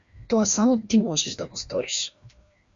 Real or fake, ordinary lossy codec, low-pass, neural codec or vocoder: fake; Opus, 64 kbps; 7.2 kHz; codec, 16 kHz, 1 kbps, FreqCodec, larger model